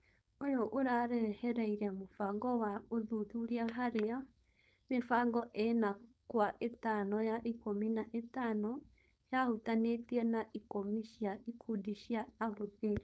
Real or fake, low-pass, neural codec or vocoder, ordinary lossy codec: fake; none; codec, 16 kHz, 4.8 kbps, FACodec; none